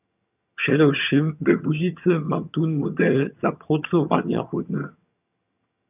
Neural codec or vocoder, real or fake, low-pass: vocoder, 22.05 kHz, 80 mel bands, HiFi-GAN; fake; 3.6 kHz